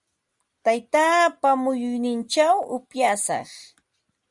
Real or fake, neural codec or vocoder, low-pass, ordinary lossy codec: real; none; 10.8 kHz; Opus, 64 kbps